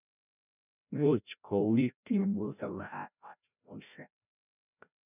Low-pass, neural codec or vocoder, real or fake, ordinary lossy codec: 3.6 kHz; codec, 16 kHz, 0.5 kbps, FreqCodec, larger model; fake; none